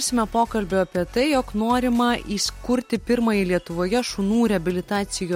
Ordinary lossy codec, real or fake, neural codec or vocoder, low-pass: MP3, 64 kbps; real; none; 19.8 kHz